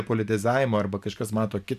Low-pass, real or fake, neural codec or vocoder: 14.4 kHz; real; none